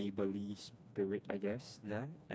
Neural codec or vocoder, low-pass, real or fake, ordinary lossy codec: codec, 16 kHz, 2 kbps, FreqCodec, smaller model; none; fake; none